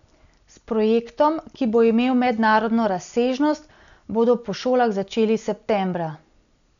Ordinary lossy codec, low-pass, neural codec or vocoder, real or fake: none; 7.2 kHz; none; real